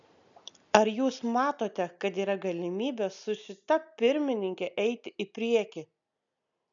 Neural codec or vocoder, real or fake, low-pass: none; real; 7.2 kHz